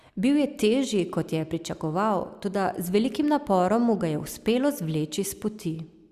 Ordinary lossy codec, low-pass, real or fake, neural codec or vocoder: Opus, 64 kbps; 14.4 kHz; real; none